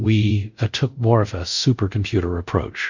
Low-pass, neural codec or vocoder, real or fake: 7.2 kHz; codec, 24 kHz, 0.5 kbps, DualCodec; fake